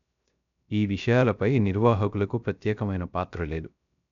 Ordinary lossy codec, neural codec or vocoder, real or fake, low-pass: none; codec, 16 kHz, 0.3 kbps, FocalCodec; fake; 7.2 kHz